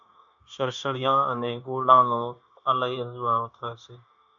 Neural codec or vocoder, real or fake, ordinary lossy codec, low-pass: codec, 16 kHz, 0.9 kbps, LongCat-Audio-Codec; fake; AAC, 64 kbps; 7.2 kHz